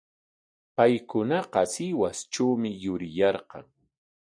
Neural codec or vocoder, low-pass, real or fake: none; 9.9 kHz; real